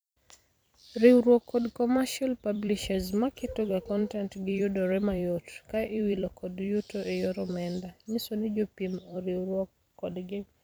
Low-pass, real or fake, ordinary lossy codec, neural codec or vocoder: none; fake; none; vocoder, 44.1 kHz, 128 mel bands every 256 samples, BigVGAN v2